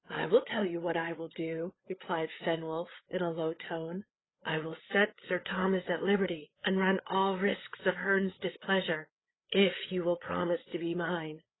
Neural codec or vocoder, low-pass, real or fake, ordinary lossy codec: codec, 16 kHz, 8 kbps, FreqCodec, larger model; 7.2 kHz; fake; AAC, 16 kbps